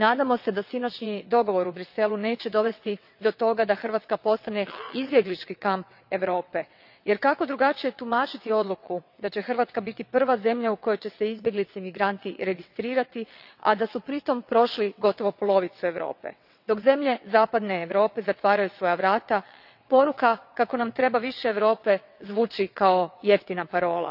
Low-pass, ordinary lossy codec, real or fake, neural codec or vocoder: 5.4 kHz; none; fake; vocoder, 22.05 kHz, 80 mel bands, WaveNeXt